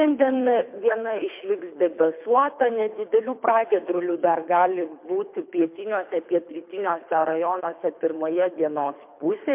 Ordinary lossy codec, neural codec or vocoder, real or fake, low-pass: MP3, 32 kbps; codec, 24 kHz, 3 kbps, HILCodec; fake; 3.6 kHz